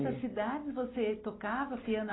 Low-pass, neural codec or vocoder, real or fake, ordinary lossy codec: 7.2 kHz; none; real; AAC, 16 kbps